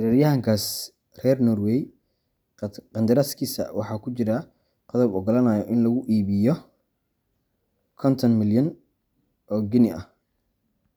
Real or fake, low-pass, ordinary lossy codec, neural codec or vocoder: real; none; none; none